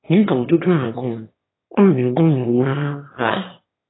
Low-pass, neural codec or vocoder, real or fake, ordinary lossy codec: 7.2 kHz; autoencoder, 22.05 kHz, a latent of 192 numbers a frame, VITS, trained on one speaker; fake; AAC, 16 kbps